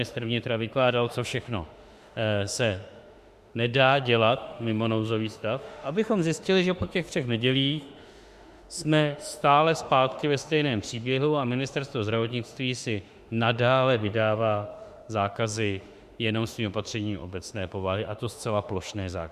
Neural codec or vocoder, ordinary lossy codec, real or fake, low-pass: autoencoder, 48 kHz, 32 numbers a frame, DAC-VAE, trained on Japanese speech; Opus, 64 kbps; fake; 14.4 kHz